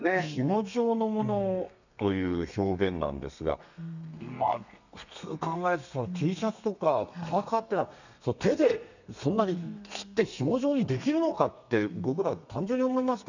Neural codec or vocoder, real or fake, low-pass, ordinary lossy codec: codec, 32 kHz, 1.9 kbps, SNAC; fake; 7.2 kHz; none